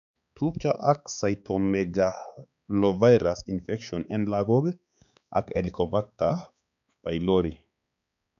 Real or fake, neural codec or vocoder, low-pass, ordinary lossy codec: fake; codec, 16 kHz, 4 kbps, X-Codec, HuBERT features, trained on balanced general audio; 7.2 kHz; none